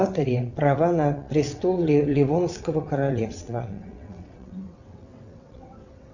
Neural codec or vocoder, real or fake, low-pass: vocoder, 22.05 kHz, 80 mel bands, WaveNeXt; fake; 7.2 kHz